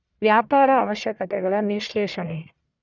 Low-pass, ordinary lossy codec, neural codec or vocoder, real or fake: 7.2 kHz; none; codec, 44.1 kHz, 1.7 kbps, Pupu-Codec; fake